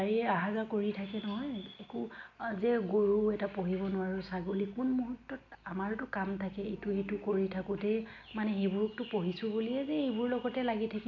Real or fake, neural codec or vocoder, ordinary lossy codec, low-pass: real; none; none; 7.2 kHz